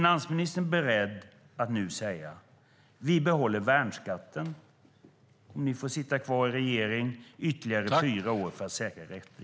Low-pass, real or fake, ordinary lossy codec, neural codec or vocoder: none; real; none; none